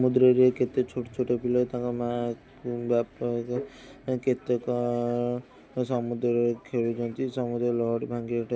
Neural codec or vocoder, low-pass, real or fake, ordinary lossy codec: none; none; real; none